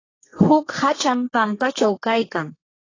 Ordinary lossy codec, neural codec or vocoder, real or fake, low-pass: AAC, 32 kbps; codec, 32 kHz, 1.9 kbps, SNAC; fake; 7.2 kHz